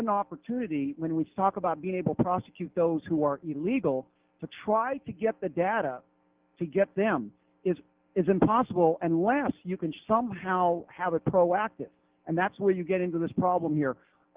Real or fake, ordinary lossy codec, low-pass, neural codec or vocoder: real; Opus, 16 kbps; 3.6 kHz; none